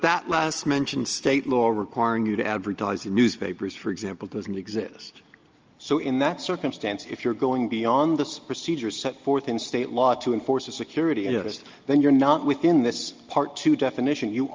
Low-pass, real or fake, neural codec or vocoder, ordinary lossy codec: 7.2 kHz; real; none; Opus, 24 kbps